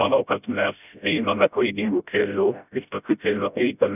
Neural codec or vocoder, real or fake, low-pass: codec, 16 kHz, 0.5 kbps, FreqCodec, smaller model; fake; 3.6 kHz